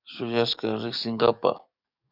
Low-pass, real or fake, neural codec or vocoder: 5.4 kHz; fake; codec, 44.1 kHz, 7.8 kbps, DAC